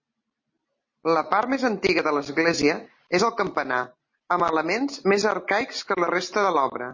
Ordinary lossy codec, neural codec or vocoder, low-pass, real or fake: MP3, 32 kbps; none; 7.2 kHz; real